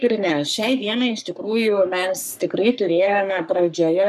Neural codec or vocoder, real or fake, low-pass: codec, 44.1 kHz, 3.4 kbps, Pupu-Codec; fake; 14.4 kHz